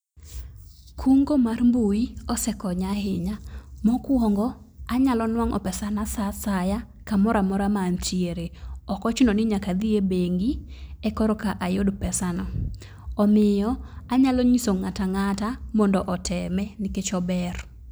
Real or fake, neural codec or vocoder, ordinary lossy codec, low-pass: real; none; none; none